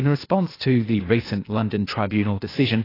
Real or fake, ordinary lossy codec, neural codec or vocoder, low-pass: fake; AAC, 24 kbps; codec, 16 kHz, 0.8 kbps, ZipCodec; 5.4 kHz